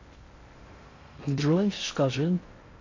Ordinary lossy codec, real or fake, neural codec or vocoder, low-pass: AAC, 32 kbps; fake; codec, 16 kHz in and 24 kHz out, 0.6 kbps, FocalCodec, streaming, 2048 codes; 7.2 kHz